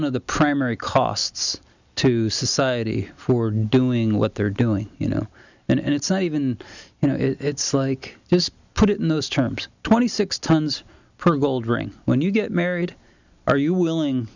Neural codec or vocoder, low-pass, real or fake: none; 7.2 kHz; real